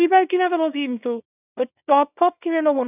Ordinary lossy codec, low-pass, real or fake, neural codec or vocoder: none; 3.6 kHz; fake; codec, 24 kHz, 0.9 kbps, WavTokenizer, small release